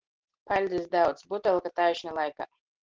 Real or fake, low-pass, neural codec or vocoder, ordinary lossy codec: real; 7.2 kHz; none; Opus, 16 kbps